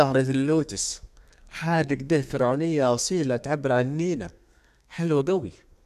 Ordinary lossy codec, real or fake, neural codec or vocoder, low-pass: none; fake; codec, 32 kHz, 1.9 kbps, SNAC; 14.4 kHz